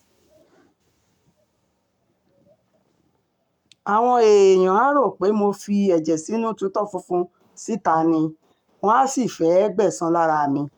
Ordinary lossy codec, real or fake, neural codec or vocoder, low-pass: none; fake; codec, 44.1 kHz, 7.8 kbps, Pupu-Codec; 19.8 kHz